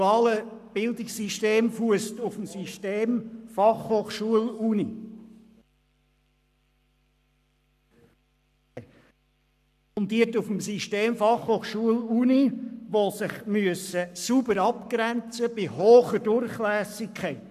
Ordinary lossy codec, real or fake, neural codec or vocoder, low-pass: none; fake; codec, 44.1 kHz, 7.8 kbps, Pupu-Codec; 14.4 kHz